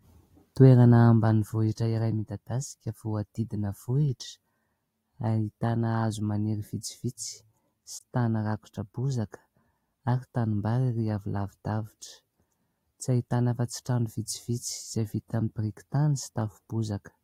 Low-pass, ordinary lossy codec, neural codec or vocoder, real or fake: 19.8 kHz; AAC, 48 kbps; none; real